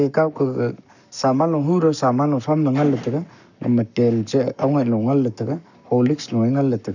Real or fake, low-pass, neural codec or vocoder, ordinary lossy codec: fake; 7.2 kHz; codec, 44.1 kHz, 7.8 kbps, Pupu-Codec; none